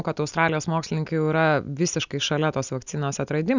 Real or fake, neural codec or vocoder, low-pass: real; none; 7.2 kHz